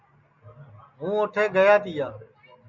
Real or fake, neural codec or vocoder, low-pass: real; none; 7.2 kHz